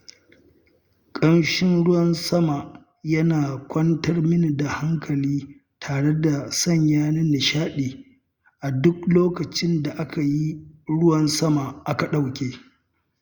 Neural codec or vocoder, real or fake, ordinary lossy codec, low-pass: none; real; Opus, 64 kbps; 19.8 kHz